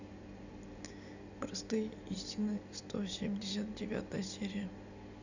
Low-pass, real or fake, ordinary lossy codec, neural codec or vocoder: 7.2 kHz; real; none; none